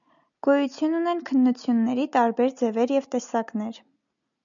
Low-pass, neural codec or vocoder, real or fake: 7.2 kHz; none; real